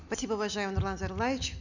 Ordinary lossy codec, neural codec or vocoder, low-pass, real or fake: none; none; 7.2 kHz; real